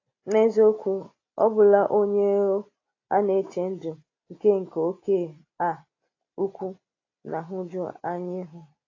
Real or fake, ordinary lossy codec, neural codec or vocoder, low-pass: real; AAC, 32 kbps; none; 7.2 kHz